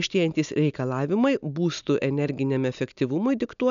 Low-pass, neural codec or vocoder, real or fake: 7.2 kHz; none; real